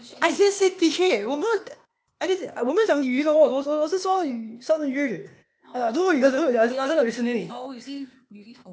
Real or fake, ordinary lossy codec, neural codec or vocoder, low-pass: fake; none; codec, 16 kHz, 0.8 kbps, ZipCodec; none